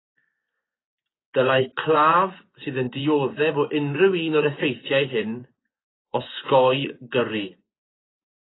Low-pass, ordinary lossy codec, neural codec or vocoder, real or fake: 7.2 kHz; AAC, 16 kbps; vocoder, 24 kHz, 100 mel bands, Vocos; fake